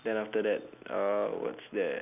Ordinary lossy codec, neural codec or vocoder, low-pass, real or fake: none; none; 3.6 kHz; real